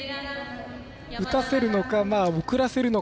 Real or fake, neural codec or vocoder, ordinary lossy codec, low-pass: real; none; none; none